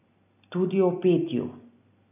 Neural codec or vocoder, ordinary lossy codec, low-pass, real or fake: none; none; 3.6 kHz; real